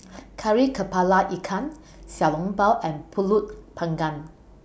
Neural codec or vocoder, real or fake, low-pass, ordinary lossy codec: none; real; none; none